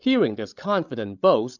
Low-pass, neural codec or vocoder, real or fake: 7.2 kHz; codec, 16 kHz, 16 kbps, FreqCodec, larger model; fake